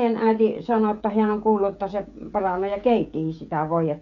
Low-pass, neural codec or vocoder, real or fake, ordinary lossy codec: 7.2 kHz; codec, 16 kHz, 16 kbps, FreqCodec, smaller model; fake; none